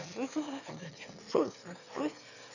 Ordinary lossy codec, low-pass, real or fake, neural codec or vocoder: none; 7.2 kHz; fake; autoencoder, 22.05 kHz, a latent of 192 numbers a frame, VITS, trained on one speaker